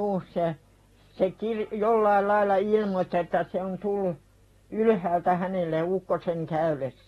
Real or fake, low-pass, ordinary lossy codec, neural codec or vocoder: real; 19.8 kHz; AAC, 32 kbps; none